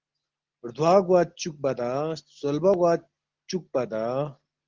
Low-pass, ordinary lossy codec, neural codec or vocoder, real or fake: 7.2 kHz; Opus, 16 kbps; none; real